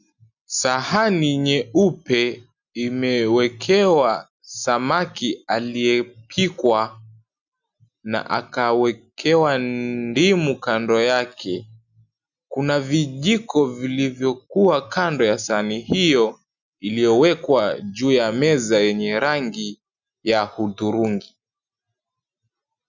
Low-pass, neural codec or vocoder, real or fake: 7.2 kHz; none; real